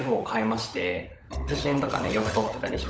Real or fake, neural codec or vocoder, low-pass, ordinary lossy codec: fake; codec, 16 kHz, 16 kbps, FunCodec, trained on LibriTTS, 50 frames a second; none; none